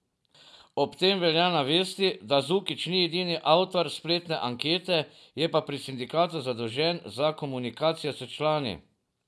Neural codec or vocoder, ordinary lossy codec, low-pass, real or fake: none; none; none; real